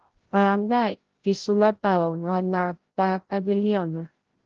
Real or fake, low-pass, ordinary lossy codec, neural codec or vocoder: fake; 7.2 kHz; Opus, 16 kbps; codec, 16 kHz, 0.5 kbps, FreqCodec, larger model